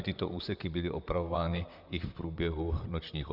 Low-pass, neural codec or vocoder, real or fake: 5.4 kHz; vocoder, 44.1 kHz, 128 mel bands, Pupu-Vocoder; fake